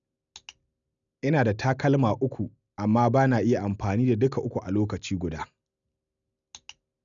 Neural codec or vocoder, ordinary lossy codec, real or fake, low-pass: none; none; real; 7.2 kHz